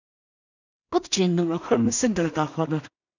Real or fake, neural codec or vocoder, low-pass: fake; codec, 16 kHz in and 24 kHz out, 0.4 kbps, LongCat-Audio-Codec, two codebook decoder; 7.2 kHz